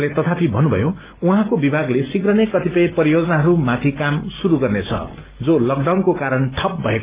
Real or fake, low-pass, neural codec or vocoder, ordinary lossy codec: real; 3.6 kHz; none; Opus, 32 kbps